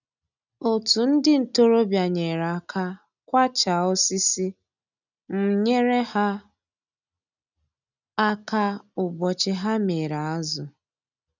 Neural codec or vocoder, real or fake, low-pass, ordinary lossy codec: none; real; 7.2 kHz; none